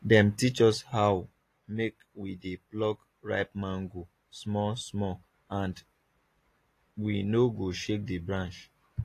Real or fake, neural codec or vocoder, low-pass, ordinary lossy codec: real; none; 14.4 kHz; AAC, 48 kbps